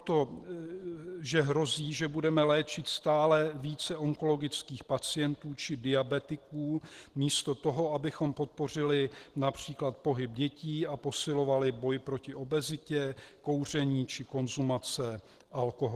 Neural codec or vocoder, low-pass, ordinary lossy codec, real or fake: none; 14.4 kHz; Opus, 16 kbps; real